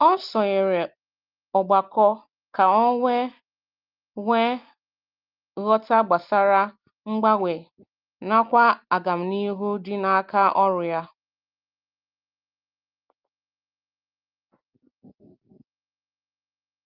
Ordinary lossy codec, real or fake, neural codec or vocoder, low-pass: Opus, 32 kbps; real; none; 5.4 kHz